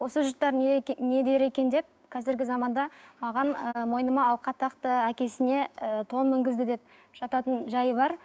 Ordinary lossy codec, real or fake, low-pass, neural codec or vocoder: none; fake; none; codec, 16 kHz, 6 kbps, DAC